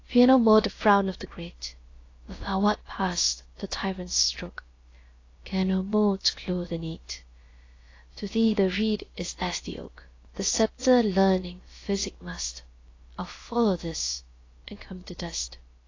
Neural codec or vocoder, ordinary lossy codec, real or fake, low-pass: codec, 16 kHz, about 1 kbps, DyCAST, with the encoder's durations; AAC, 32 kbps; fake; 7.2 kHz